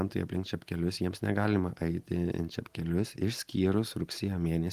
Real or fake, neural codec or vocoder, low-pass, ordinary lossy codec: real; none; 14.4 kHz; Opus, 24 kbps